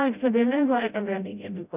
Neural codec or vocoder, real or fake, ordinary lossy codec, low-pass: codec, 16 kHz, 0.5 kbps, FreqCodec, smaller model; fake; none; 3.6 kHz